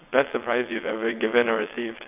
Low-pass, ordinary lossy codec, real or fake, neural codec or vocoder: 3.6 kHz; AAC, 32 kbps; fake; vocoder, 22.05 kHz, 80 mel bands, WaveNeXt